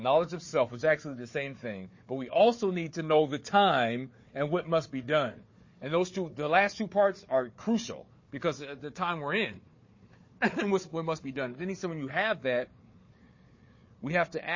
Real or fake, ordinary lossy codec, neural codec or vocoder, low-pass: fake; MP3, 32 kbps; codec, 16 kHz, 4 kbps, FunCodec, trained on Chinese and English, 50 frames a second; 7.2 kHz